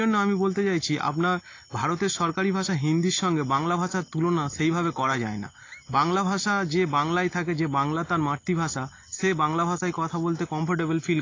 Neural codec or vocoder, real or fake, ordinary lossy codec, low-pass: none; real; AAC, 32 kbps; 7.2 kHz